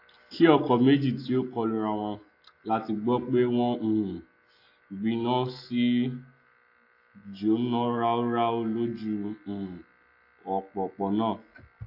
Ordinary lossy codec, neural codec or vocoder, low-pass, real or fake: AAC, 32 kbps; none; 5.4 kHz; real